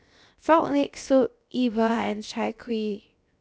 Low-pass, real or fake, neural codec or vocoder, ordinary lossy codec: none; fake; codec, 16 kHz, 0.7 kbps, FocalCodec; none